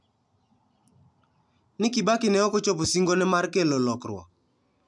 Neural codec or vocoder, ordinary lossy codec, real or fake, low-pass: none; none; real; 10.8 kHz